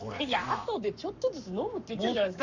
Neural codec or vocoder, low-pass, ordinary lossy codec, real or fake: codec, 44.1 kHz, 7.8 kbps, Pupu-Codec; 7.2 kHz; none; fake